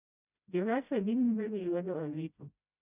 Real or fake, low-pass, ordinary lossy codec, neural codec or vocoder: fake; 3.6 kHz; none; codec, 16 kHz, 0.5 kbps, FreqCodec, smaller model